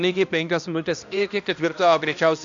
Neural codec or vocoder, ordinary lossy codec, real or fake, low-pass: codec, 16 kHz, 1 kbps, X-Codec, HuBERT features, trained on balanced general audio; AAC, 64 kbps; fake; 7.2 kHz